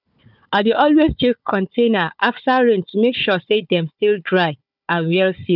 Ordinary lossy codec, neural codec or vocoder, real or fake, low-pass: none; codec, 16 kHz, 4 kbps, FunCodec, trained on Chinese and English, 50 frames a second; fake; 5.4 kHz